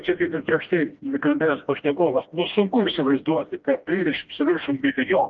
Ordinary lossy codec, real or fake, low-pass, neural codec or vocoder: Opus, 24 kbps; fake; 7.2 kHz; codec, 16 kHz, 1 kbps, FreqCodec, smaller model